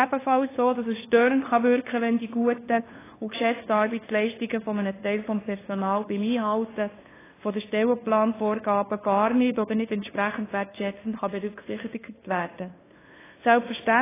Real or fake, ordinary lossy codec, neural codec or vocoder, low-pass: fake; AAC, 16 kbps; codec, 16 kHz, 2 kbps, FunCodec, trained on LibriTTS, 25 frames a second; 3.6 kHz